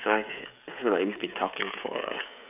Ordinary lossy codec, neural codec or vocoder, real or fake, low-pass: none; codec, 16 kHz, 16 kbps, FreqCodec, smaller model; fake; 3.6 kHz